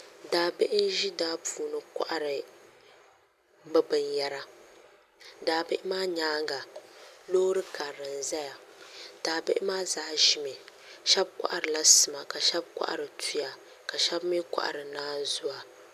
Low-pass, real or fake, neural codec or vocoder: 14.4 kHz; real; none